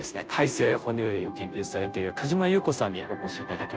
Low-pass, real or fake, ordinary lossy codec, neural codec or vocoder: none; fake; none; codec, 16 kHz, 0.5 kbps, FunCodec, trained on Chinese and English, 25 frames a second